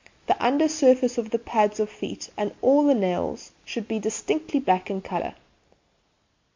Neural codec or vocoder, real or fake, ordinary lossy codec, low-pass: none; real; MP3, 48 kbps; 7.2 kHz